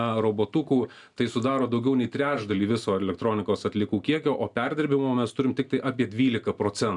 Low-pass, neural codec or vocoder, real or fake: 10.8 kHz; none; real